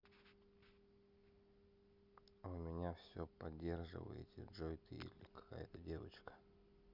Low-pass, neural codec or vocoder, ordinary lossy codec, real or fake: 5.4 kHz; none; Opus, 64 kbps; real